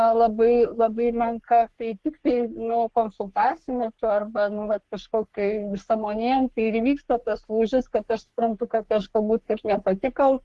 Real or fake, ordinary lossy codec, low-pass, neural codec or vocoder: fake; Opus, 16 kbps; 10.8 kHz; codec, 44.1 kHz, 3.4 kbps, Pupu-Codec